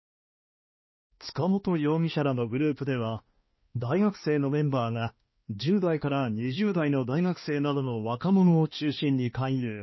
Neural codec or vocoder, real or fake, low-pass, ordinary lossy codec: codec, 16 kHz, 2 kbps, X-Codec, HuBERT features, trained on balanced general audio; fake; 7.2 kHz; MP3, 24 kbps